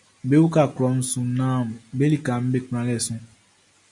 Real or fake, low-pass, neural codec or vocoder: real; 10.8 kHz; none